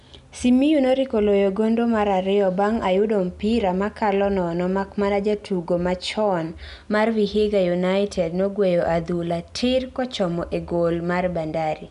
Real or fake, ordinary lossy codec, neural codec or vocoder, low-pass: real; none; none; 10.8 kHz